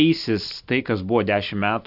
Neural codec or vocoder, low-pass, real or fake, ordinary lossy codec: none; 5.4 kHz; real; AAC, 48 kbps